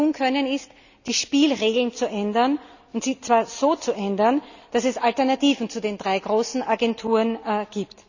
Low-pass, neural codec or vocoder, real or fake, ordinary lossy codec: 7.2 kHz; none; real; none